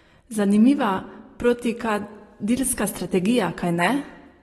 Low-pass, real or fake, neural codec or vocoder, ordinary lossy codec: 19.8 kHz; real; none; AAC, 32 kbps